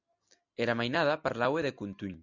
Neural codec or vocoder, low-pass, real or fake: none; 7.2 kHz; real